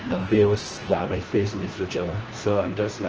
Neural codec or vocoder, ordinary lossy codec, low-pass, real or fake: codec, 16 kHz, 1 kbps, FunCodec, trained on LibriTTS, 50 frames a second; Opus, 16 kbps; 7.2 kHz; fake